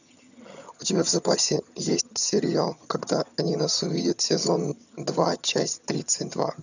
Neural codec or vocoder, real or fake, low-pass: vocoder, 22.05 kHz, 80 mel bands, HiFi-GAN; fake; 7.2 kHz